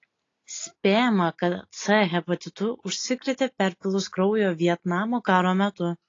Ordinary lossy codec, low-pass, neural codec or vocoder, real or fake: AAC, 32 kbps; 7.2 kHz; none; real